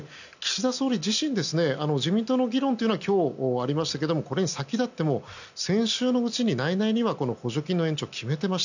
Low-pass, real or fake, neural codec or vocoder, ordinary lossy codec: 7.2 kHz; real; none; none